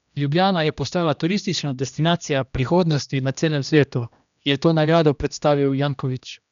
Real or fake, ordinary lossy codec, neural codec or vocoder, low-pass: fake; none; codec, 16 kHz, 1 kbps, X-Codec, HuBERT features, trained on general audio; 7.2 kHz